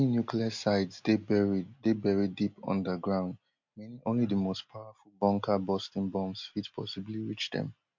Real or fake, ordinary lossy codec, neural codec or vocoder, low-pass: real; MP3, 48 kbps; none; 7.2 kHz